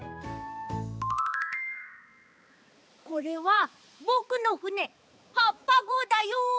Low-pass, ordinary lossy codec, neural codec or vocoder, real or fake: none; none; codec, 16 kHz, 4 kbps, X-Codec, HuBERT features, trained on balanced general audio; fake